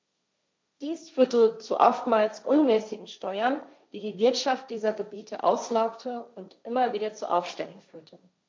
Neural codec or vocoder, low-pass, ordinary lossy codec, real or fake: codec, 16 kHz, 1.1 kbps, Voila-Tokenizer; none; none; fake